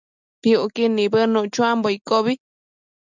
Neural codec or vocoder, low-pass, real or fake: none; 7.2 kHz; real